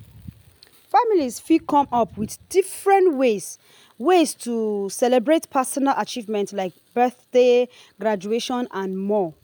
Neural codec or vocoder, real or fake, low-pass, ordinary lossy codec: none; real; none; none